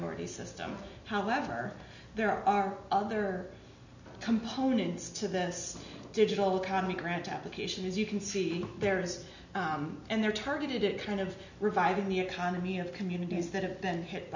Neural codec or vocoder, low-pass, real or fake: none; 7.2 kHz; real